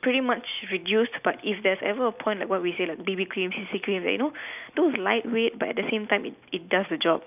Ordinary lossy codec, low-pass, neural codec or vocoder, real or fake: none; 3.6 kHz; none; real